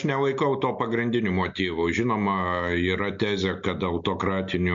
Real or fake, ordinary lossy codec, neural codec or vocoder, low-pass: real; MP3, 48 kbps; none; 7.2 kHz